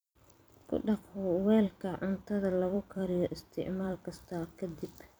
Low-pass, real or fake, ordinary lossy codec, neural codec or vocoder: none; fake; none; vocoder, 44.1 kHz, 128 mel bands every 256 samples, BigVGAN v2